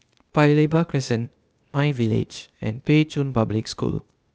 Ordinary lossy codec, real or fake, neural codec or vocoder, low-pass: none; fake; codec, 16 kHz, 0.8 kbps, ZipCodec; none